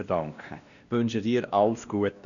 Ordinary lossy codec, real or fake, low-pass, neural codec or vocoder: none; fake; 7.2 kHz; codec, 16 kHz, 1 kbps, X-Codec, WavLM features, trained on Multilingual LibriSpeech